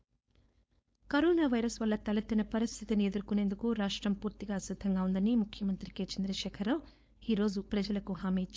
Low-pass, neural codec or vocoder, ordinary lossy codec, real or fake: none; codec, 16 kHz, 4.8 kbps, FACodec; none; fake